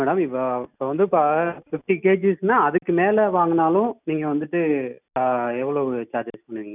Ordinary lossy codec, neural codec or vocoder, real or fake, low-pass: none; none; real; 3.6 kHz